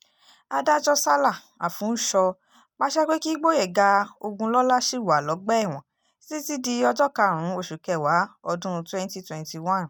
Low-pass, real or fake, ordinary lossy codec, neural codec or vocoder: none; real; none; none